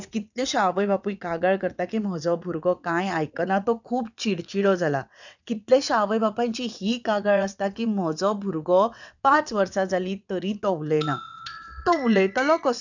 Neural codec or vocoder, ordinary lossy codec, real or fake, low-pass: vocoder, 22.05 kHz, 80 mel bands, WaveNeXt; none; fake; 7.2 kHz